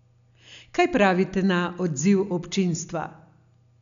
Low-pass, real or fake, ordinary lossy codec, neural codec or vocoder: 7.2 kHz; real; none; none